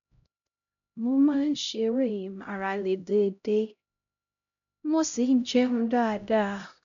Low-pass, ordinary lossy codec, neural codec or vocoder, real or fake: 7.2 kHz; none; codec, 16 kHz, 0.5 kbps, X-Codec, HuBERT features, trained on LibriSpeech; fake